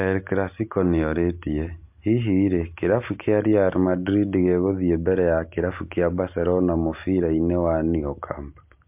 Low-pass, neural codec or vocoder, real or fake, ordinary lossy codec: 3.6 kHz; none; real; MP3, 32 kbps